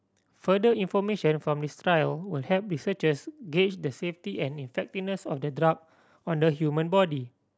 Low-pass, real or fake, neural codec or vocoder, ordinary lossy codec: none; real; none; none